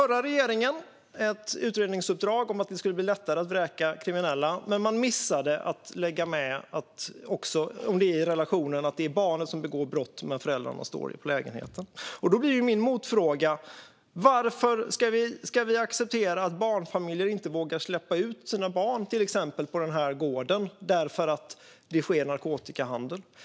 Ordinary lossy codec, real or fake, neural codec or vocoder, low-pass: none; real; none; none